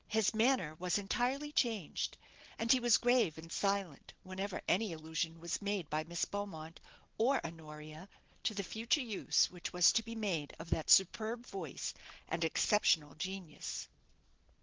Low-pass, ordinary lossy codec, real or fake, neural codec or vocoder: 7.2 kHz; Opus, 16 kbps; real; none